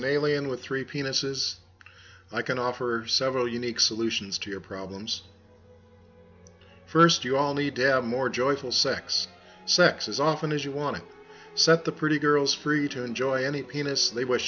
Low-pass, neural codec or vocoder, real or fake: 7.2 kHz; none; real